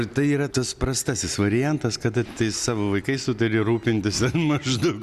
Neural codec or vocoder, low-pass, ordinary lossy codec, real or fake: none; 14.4 kHz; Opus, 64 kbps; real